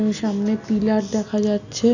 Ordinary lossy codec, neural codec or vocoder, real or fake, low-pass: none; none; real; 7.2 kHz